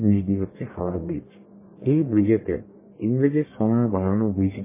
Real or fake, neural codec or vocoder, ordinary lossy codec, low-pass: fake; codec, 44.1 kHz, 1.7 kbps, Pupu-Codec; MP3, 16 kbps; 3.6 kHz